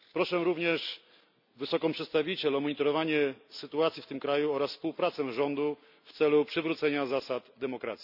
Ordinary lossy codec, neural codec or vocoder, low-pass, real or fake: none; none; 5.4 kHz; real